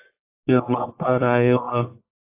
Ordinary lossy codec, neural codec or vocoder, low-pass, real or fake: AAC, 32 kbps; codec, 44.1 kHz, 1.7 kbps, Pupu-Codec; 3.6 kHz; fake